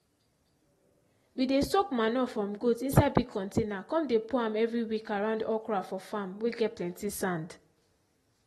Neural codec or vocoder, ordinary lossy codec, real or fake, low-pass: none; AAC, 32 kbps; real; 19.8 kHz